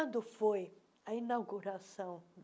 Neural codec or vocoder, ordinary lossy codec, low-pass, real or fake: none; none; none; real